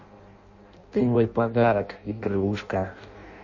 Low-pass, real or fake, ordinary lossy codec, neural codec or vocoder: 7.2 kHz; fake; MP3, 32 kbps; codec, 16 kHz in and 24 kHz out, 0.6 kbps, FireRedTTS-2 codec